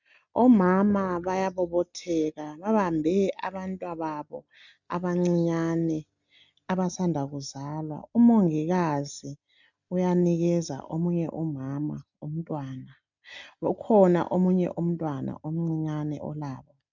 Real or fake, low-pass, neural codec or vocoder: real; 7.2 kHz; none